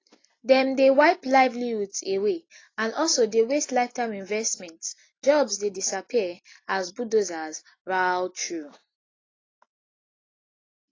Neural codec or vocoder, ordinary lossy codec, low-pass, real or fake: none; AAC, 32 kbps; 7.2 kHz; real